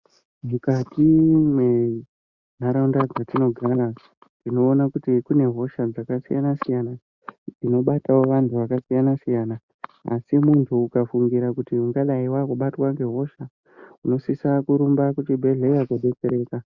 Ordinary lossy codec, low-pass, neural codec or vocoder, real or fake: Opus, 64 kbps; 7.2 kHz; none; real